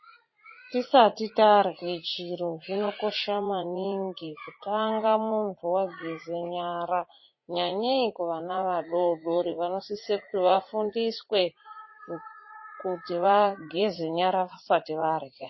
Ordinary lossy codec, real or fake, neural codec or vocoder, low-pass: MP3, 24 kbps; fake; vocoder, 44.1 kHz, 80 mel bands, Vocos; 7.2 kHz